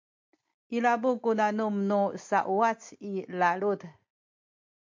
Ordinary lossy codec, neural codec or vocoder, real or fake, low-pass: MP3, 48 kbps; none; real; 7.2 kHz